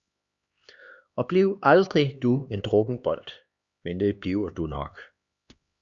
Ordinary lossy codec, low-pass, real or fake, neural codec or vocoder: Opus, 64 kbps; 7.2 kHz; fake; codec, 16 kHz, 2 kbps, X-Codec, HuBERT features, trained on LibriSpeech